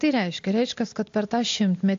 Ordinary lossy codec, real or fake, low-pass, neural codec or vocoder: AAC, 48 kbps; real; 7.2 kHz; none